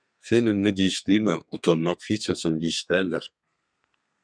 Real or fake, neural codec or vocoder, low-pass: fake; codec, 32 kHz, 1.9 kbps, SNAC; 9.9 kHz